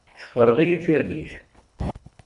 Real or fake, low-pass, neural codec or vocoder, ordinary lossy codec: fake; 10.8 kHz; codec, 24 kHz, 1.5 kbps, HILCodec; AAC, 96 kbps